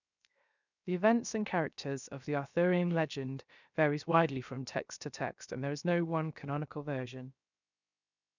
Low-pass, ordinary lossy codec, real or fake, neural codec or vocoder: 7.2 kHz; none; fake; codec, 16 kHz, 0.7 kbps, FocalCodec